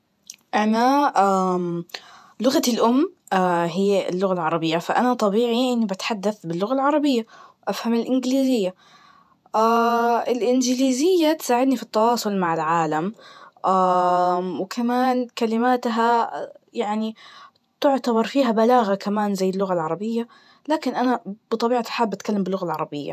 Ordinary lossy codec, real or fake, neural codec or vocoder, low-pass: none; fake; vocoder, 48 kHz, 128 mel bands, Vocos; 14.4 kHz